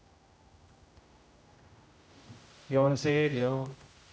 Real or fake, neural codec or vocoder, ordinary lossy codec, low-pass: fake; codec, 16 kHz, 0.5 kbps, X-Codec, HuBERT features, trained on general audio; none; none